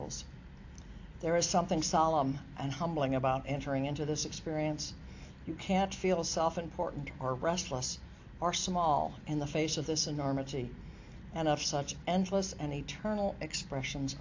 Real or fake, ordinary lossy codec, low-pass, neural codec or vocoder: real; AAC, 48 kbps; 7.2 kHz; none